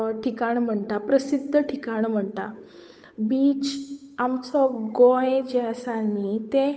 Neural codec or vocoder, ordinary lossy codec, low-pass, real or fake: codec, 16 kHz, 8 kbps, FunCodec, trained on Chinese and English, 25 frames a second; none; none; fake